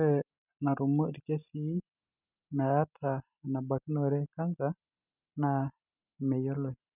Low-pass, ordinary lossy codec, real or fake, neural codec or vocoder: 3.6 kHz; none; real; none